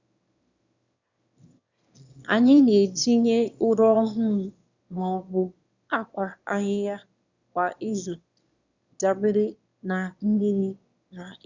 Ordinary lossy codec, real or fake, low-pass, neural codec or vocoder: Opus, 64 kbps; fake; 7.2 kHz; autoencoder, 22.05 kHz, a latent of 192 numbers a frame, VITS, trained on one speaker